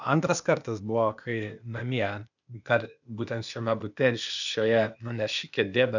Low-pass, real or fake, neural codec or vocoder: 7.2 kHz; fake; codec, 16 kHz, 0.8 kbps, ZipCodec